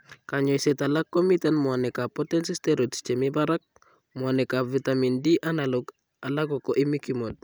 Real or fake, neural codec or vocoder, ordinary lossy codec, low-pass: real; none; none; none